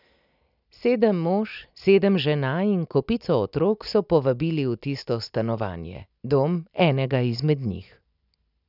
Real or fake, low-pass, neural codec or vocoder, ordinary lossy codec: real; 5.4 kHz; none; none